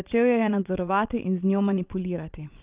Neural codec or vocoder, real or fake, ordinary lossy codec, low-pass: vocoder, 22.05 kHz, 80 mel bands, Vocos; fake; Opus, 24 kbps; 3.6 kHz